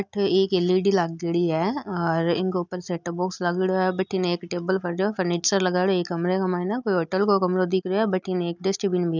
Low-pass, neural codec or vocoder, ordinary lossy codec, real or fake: 7.2 kHz; none; none; real